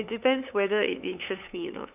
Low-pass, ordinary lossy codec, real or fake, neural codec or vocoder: 3.6 kHz; none; fake; codec, 16 kHz, 8 kbps, FunCodec, trained on LibriTTS, 25 frames a second